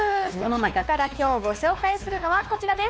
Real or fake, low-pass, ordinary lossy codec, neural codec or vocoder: fake; none; none; codec, 16 kHz, 2 kbps, X-Codec, WavLM features, trained on Multilingual LibriSpeech